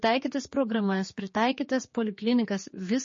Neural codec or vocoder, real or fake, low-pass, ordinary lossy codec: codec, 16 kHz, 4 kbps, X-Codec, HuBERT features, trained on general audio; fake; 7.2 kHz; MP3, 32 kbps